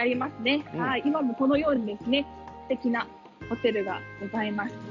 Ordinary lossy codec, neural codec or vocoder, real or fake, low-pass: none; none; real; 7.2 kHz